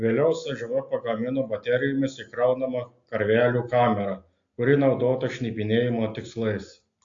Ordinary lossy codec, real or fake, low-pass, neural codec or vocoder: AAC, 48 kbps; real; 7.2 kHz; none